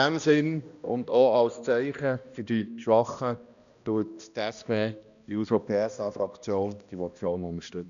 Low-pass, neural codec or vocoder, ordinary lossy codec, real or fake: 7.2 kHz; codec, 16 kHz, 1 kbps, X-Codec, HuBERT features, trained on balanced general audio; none; fake